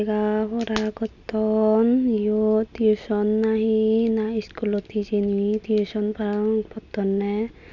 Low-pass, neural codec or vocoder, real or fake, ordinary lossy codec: 7.2 kHz; none; real; none